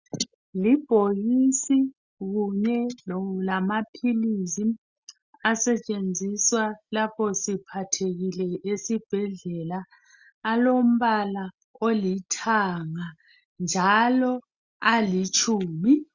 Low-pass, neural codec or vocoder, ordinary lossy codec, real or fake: 7.2 kHz; none; Opus, 64 kbps; real